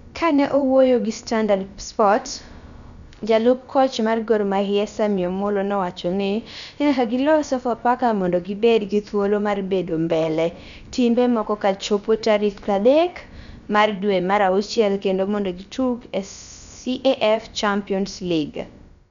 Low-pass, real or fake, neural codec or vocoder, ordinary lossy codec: 7.2 kHz; fake; codec, 16 kHz, about 1 kbps, DyCAST, with the encoder's durations; none